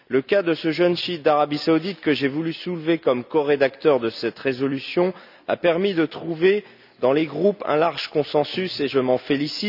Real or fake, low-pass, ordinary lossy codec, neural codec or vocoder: real; 5.4 kHz; none; none